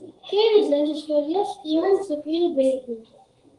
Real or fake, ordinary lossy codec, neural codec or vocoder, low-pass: fake; Opus, 24 kbps; codec, 32 kHz, 1.9 kbps, SNAC; 10.8 kHz